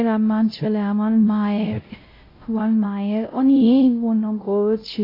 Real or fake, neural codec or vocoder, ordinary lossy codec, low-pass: fake; codec, 16 kHz, 0.5 kbps, X-Codec, WavLM features, trained on Multilingual LibriSpeech; AAC, 24 kbps; 5.4 kHz